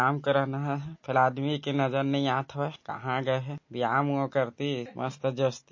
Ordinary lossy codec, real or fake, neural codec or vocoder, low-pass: MP3, 32 kbps; real; none; 7.2 kHz